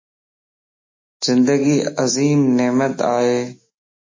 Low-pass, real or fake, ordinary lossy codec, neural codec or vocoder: 7.2 kHz; fake; MP3, 32 kbps; autoencoder, 48 kHz, 128 numbers a frame, DAC-VAE, trained on Japanese speech